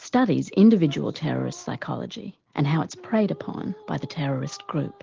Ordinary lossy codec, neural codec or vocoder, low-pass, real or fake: Opus, 16 kbps; none; 7.2 kHz; real